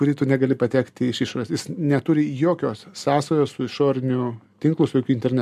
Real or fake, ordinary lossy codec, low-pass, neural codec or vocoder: real; AAC, 96 kbps; 14.4 kHz; none